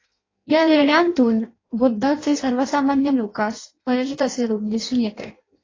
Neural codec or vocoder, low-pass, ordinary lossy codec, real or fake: codec, 16 kHz in and 24 kHz out, 0.6 kbps, FireRedTTS-2 codec; 7.2 kHz; AAC, 32 kbps; fake